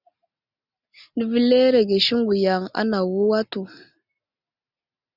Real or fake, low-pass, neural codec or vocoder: real; 5.4 kHz; none